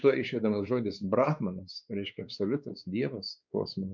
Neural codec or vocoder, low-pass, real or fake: codec, 16 kHz, 4 kbps, X-Codec, WavLM features, trained on Multilingual LibriSpeech; 7.2 kHz; fake